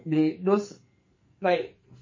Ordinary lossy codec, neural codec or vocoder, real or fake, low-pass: MP3, 32 kbps; codec, 44.1 kHz, 2.6 kbps, SNAC; fake; 7.2 kHz